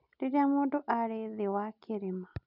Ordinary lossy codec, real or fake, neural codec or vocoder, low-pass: none; real; none; 5.4 kHz